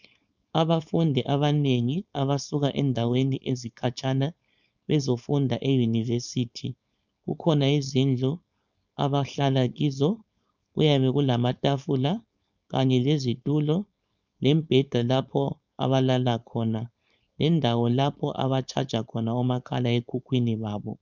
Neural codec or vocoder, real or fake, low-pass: codec, 16 kHz, 4.8 kbps, FACodec; fake; 7.2 kHz